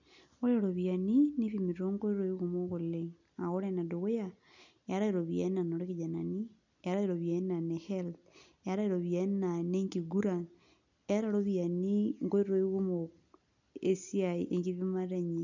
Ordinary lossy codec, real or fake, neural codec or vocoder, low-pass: none; real; none; 7.2 kHz